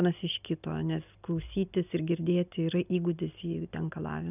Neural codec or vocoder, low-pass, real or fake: none; 3.6 kHz; real